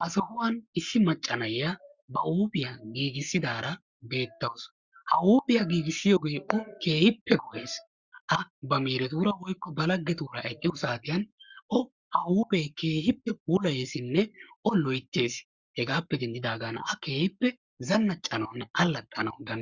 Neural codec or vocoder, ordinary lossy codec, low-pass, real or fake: codec, 44.1 kHz, 7.8 kbps, Pupu-Codec; Opus, 64 kbps; 7.2 kHz; fake